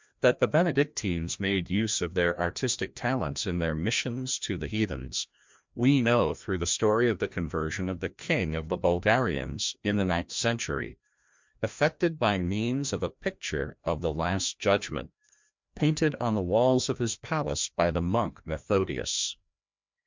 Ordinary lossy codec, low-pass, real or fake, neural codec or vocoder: MP3, 64 kbps; 7.2 kHz; fake; codec, 16 kHz, 1 kbps, FreqCodec, larger model